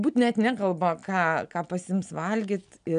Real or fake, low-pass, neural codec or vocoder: real; 9.9 kHz; none